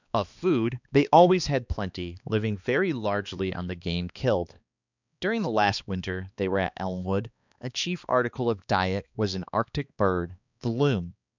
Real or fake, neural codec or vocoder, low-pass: fake; codec, 16 kHz, 2 kbps, X-Codec, HuBERT features, trained on balanced general audio; 7.2 kHz